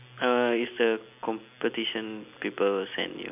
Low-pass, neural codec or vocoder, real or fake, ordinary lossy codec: 3.6 kHz; none; real; none